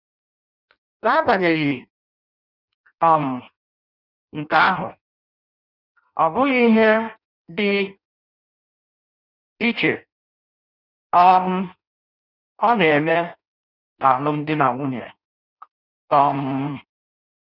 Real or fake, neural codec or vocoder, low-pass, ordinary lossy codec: fake; codec, 16 kHz in and 24 kHz out, 0.6 kbps, FireRedTTS-2 codec; 5.4 kHz; none